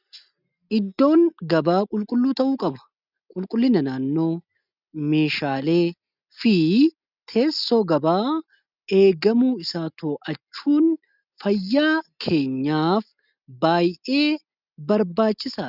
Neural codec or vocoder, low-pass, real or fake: none; 5.4 kHz; real